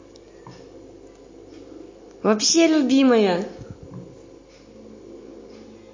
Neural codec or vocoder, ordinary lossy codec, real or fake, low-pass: none; MP3, 32 kbps; real; 7.2 kHz